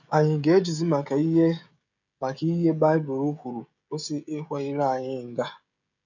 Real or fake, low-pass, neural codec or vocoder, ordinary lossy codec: fake; 7.2 kHz; codec, 16 kHz, 16 kbps, FreqCodec, smaller model; none